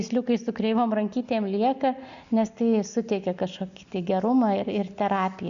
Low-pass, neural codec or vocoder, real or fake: 7.2 kHz; none; real